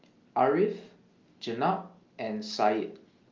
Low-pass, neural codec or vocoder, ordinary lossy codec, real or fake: 7.2 kHz; none; Opus, 24 kbps; real